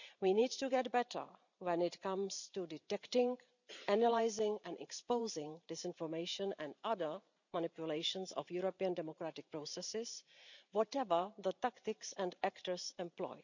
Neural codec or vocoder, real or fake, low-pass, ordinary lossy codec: vocoder, 44.1 kHz, 128 mel bands every 512 samples, BigVGAN v2; fake; 7.2 kHz; none